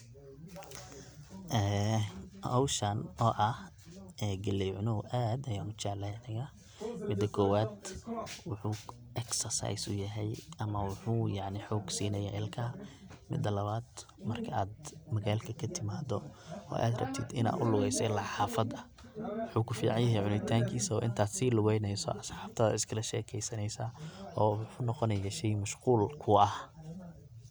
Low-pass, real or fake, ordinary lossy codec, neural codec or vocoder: none; real; none; none